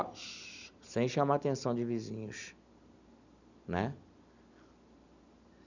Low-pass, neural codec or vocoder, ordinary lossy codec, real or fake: 7.2 kHz; none; none; real